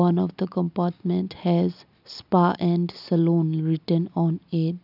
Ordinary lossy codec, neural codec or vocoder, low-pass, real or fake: none; none; 5.4 kHz; real